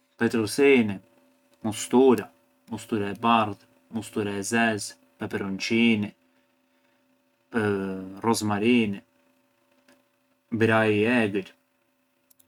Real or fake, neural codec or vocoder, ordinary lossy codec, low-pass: real; none; none; 19.8 kHz